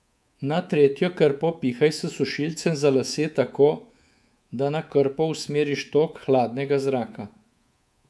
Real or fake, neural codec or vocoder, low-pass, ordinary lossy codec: fake; codec, 24 kHz, 3.1 kbps, DualCodec; none; none